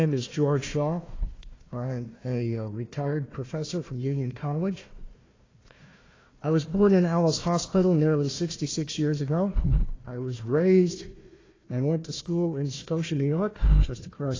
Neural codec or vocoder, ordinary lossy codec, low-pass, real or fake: codec, 16 kHz, 1 kbps, FunCodec, trained on Chinese and English, 50 frames a second; AAC, 32 kbps; 7.2 kHz; fake